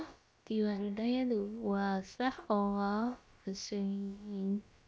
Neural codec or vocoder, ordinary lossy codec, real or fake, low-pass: codec, 16 kHz, about 1 kbps, DyCAST, with the encoder's durations; none; fake; none